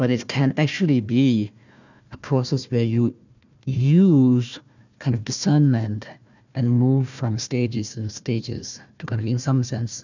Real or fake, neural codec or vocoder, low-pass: fake; codec, 16 kHz, 1 kbps, FunCodec, trained on Chinese and English, 50 frames a second; 7.2 kHz